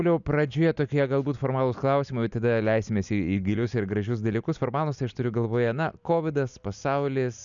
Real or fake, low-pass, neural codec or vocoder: real; 7.2 kHz; none